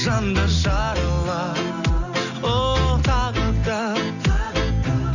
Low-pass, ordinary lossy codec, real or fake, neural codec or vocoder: 7.2 kHz; none; real; none